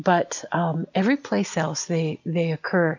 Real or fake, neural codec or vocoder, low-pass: real; none; 7.2 kHz